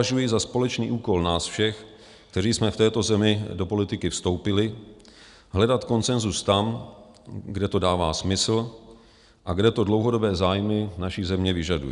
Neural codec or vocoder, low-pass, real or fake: none; 10.8 kHz; real